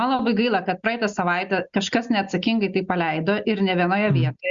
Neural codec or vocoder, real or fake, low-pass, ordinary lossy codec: none; real; 7.2 kHz; Opus, 64 kbps